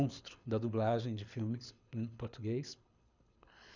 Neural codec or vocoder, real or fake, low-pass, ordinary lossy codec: codec, 24 kHz, 6 kbps, HILCodec; fake; 7.2 kHz; none